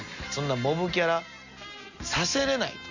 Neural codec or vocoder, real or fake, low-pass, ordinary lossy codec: none; real; 7.2 kHz; Opus, 64 kbps